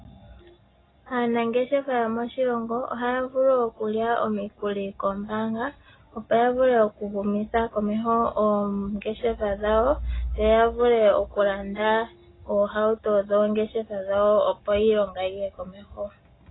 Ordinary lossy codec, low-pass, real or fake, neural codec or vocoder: AAC, 16 kbps; 7.2 kHz; real; none